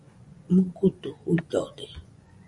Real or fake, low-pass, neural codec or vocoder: fake; 10.8 kHz; vocoder, 24 kHz, 100 mel bands, Vocos